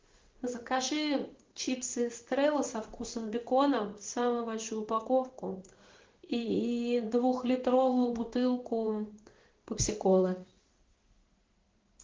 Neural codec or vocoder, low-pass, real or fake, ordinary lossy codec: codec, 16 kHz in and 24 kHz out, 1 kbps, XY-Tokenizer; 7.2 kHz; fake; Opus, 16 kbps